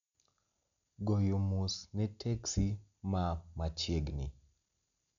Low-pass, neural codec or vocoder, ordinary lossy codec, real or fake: 7.2 kHz; none; none; real